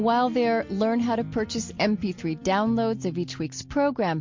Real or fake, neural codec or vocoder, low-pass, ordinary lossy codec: real; none; 7.2 kHz; MP3, 48 kbps